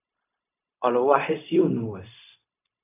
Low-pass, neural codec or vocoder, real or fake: 3.6 kHz; codec, 16 kHz, 0.4 kbps, LongCat-Audio-Codec; fake